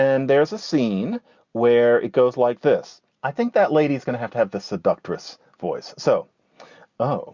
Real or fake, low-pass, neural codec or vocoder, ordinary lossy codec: real; 7.2 kHz; none; Opus, 64 kbps